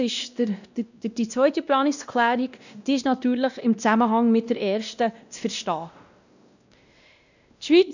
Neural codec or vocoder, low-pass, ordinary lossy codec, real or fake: codec, 16 kHz, 1 kbps, X-Codec, WavLM features, trained on Multilingual LibriSpeech; 7.2 kHz; none; fake